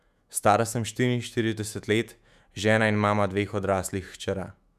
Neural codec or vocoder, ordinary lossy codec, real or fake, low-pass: autoencoder, 48 kHz, 128 numbers a frame, DAC-VAE, trained on Japanese speech; none; fake; 14.4 kHz